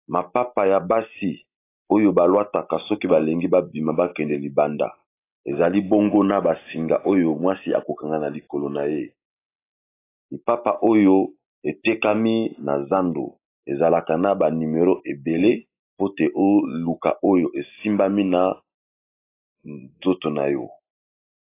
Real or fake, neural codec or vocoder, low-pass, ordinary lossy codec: real; none; 3.6 kHz; AAC, 24 kbps